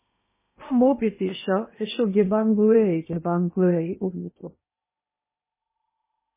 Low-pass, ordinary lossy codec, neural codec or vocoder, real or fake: 3.6 kHz; MP3, 16 kbps; codec, 16 kHz in and 24 kHz out, 0.8 kbps, FocalCodec, streaming, 65536 codes; fake